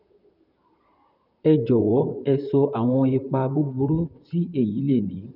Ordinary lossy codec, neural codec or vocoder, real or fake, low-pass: none; vocoder, 44.1 kHz, 128 mel bands, Pupu-Vocoder; fake; 5.4 kHz